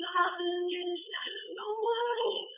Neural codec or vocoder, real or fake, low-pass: codec, 16 kHz, 4.8 kbps, FACodec; fake; 3.6 kHz